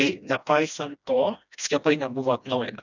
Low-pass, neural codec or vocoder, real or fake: 7.2 kHz; codec, 16 kHz, 1 kbps, FreqCodec, smaller model; fake